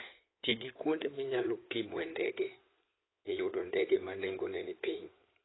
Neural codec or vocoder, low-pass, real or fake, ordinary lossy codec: codec, 16 kHz in and 24 kHz out, 2.2 kbps, FireRedTTS-2 codec; 7.2 kHz; fake; AAC, 16 kbps